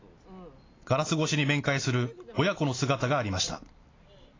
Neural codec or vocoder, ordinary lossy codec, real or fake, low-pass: none; AAC, 32 kbps; real; 7.2 kHz